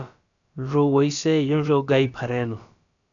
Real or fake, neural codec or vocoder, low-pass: fake; codec, 16 kHz, about 1 kbps, DyCAST, with the encoder's durations; 7.2 kHz